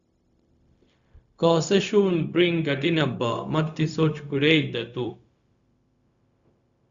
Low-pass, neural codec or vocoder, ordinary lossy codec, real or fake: 7.2 kHz; codec, 16 kHz, 0.4 kbps, LongCat-Audio-Codec; Opus, 64 kbps; fake